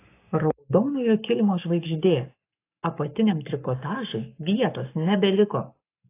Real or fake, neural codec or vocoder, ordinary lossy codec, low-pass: fake; codec, 44.1 kHz, 7.8 kbps, Pupu-Codec; AAC, 24 kbps; 3.6 kHz